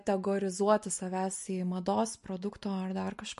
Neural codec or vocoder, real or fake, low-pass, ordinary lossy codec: none; real; 14.4 kHz; MP3, 48 kbps